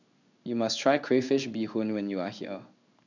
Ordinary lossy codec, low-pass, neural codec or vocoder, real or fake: none; 7.2 kHz; codec, 16 kHz in and 24 kHz out, 1 kbps, XY-Tokenizer; fake